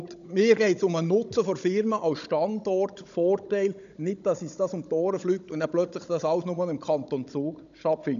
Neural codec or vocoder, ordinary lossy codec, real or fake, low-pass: codec, 16 kHz, 16 kbps, FunCodec, trained on Chinese and English, 50 frames a second; none; fake; 7.2 kHz